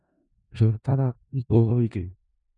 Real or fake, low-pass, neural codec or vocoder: fake; 10.8 kHz; codec, 16 kHz in and 24 kHz out, 0.4 kbps, LongCat-Audio-Codec, four codebook decoder